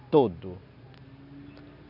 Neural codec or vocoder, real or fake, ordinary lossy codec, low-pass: none; real; none; 5.4 kHz